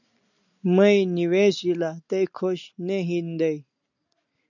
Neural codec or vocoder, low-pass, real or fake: none; 7.2 kHz; real